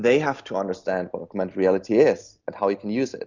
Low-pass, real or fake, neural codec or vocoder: 7.2 kHz; real; none